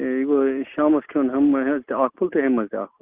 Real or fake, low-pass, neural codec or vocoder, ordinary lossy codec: real; 3.6 kHz; none; Opus, 32 kbps